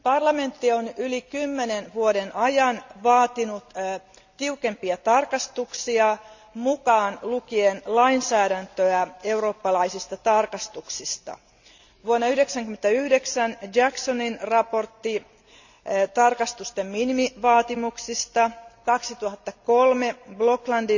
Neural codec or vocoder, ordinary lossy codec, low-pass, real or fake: none; none; 7.2 kHz; real